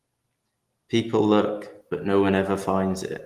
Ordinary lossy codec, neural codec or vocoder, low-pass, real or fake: Opus, 24 kbps; none; 14.4 kHz; real